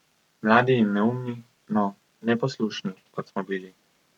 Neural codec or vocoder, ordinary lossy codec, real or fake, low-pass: codec, 44.1 kHz, 7.8 kbps, Pupu-Codec; none; fake; 19.8 kHz